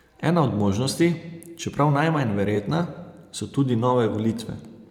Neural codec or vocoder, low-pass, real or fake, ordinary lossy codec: vocoder, 44.1 kHz, 128 mel bands every 512 samples, BigVGAN v2; 19.8 kHz; fake; none